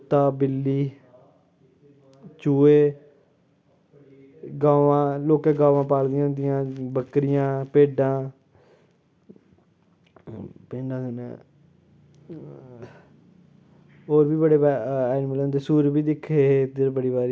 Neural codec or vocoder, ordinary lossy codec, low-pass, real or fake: none; none; none; real